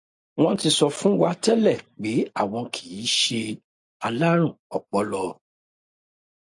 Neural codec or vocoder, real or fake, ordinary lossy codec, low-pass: none; real; AAC, 64 kbps; 10.8 kHz